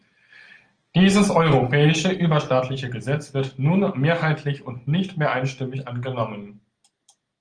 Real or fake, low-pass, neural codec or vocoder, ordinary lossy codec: real; 9.9 kHz; none; Opus, 24 kbps